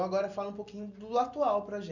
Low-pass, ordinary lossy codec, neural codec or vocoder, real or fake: 7.2 kHz; none; none; real